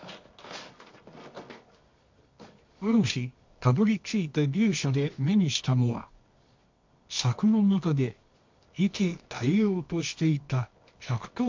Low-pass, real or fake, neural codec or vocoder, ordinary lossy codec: 7.2 kHz; fake; codec, 24 kHz, 0.9 kbps, WavTokenizer, medium music audio release; MP3, 48 kbps